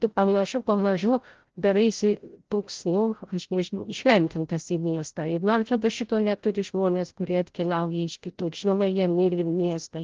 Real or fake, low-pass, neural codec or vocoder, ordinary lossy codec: fake; 7.2 kHz; codec, 16 kHz, 0.5 kbps, FreqCodec, larger model; Opus, 16 kbps